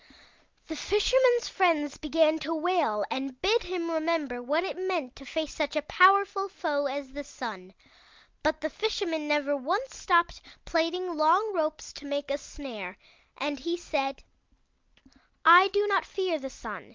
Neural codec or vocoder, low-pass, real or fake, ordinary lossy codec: none; 7.2 kHz; real; Opus, 32 kbps